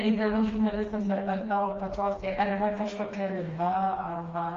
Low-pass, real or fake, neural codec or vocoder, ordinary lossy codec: 7.2 kHz; fake; codec, 16 kHz, 2 kbps, FreqCodec, smaller model; Opus, 32 kbps